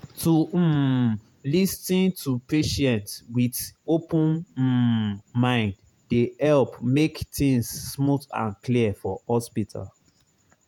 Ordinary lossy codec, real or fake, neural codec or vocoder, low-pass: none; fake; vocoder, 48 kHz, 128 mel bands, Vocos; 19.8 kHz